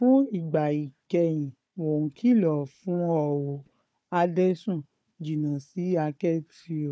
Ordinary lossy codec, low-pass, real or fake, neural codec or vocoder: none; none; fake; codec, 16 kHz, 4 kbps, FunCodec, trained on Chinese and English, 50 frames a second